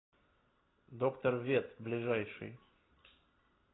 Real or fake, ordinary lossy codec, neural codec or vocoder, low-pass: real; AAC, 16 kbps; none; 7.2 kHz